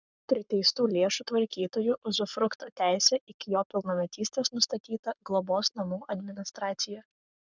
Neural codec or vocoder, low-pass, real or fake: codec, 44.1 kHz, 7.8 kbps, Pupu-Codec; 7.2 kHz; fake